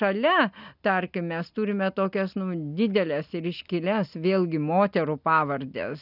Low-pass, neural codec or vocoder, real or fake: 5.4 kHz; none; real